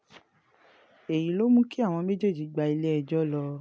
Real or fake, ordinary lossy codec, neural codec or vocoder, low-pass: real; none; none; none